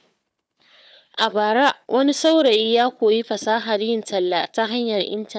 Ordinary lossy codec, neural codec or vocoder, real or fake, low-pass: none; codec, 16 kHz, 4 kbps, FunCodec, trained on Chinese and English, 50 frames a second; fake; none